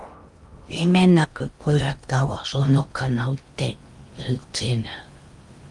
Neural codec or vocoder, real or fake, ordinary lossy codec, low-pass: codec, 16 kHz in and 24 kHz out, 0.6 kbps, FocalCodec, streaming, 2048 codes; fake; Opus, 32 kbps; 10.8 kHz